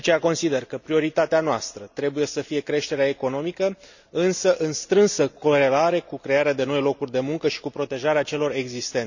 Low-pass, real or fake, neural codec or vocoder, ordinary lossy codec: 7.2 kHz; real; none; none